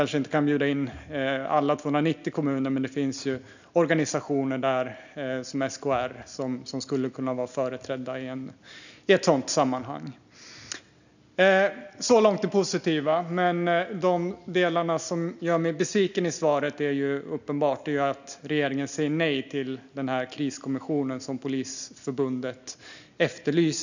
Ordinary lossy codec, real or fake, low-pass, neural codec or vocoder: none; real; 7.2 kHz; none